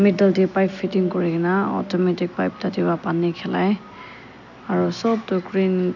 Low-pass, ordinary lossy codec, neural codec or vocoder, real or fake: 7.2 kHz; none; none; real